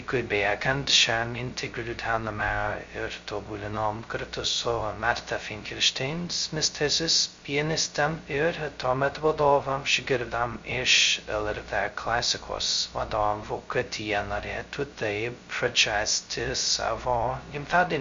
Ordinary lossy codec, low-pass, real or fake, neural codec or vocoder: MP3, 48 kbps; 7.2 kHz; fake; codec, 16 kHz, 0.2 kbps, FocalCodec